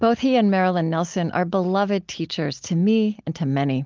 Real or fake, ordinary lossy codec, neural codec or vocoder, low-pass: real; Opus, 24 kbps; none; 7.2 kHz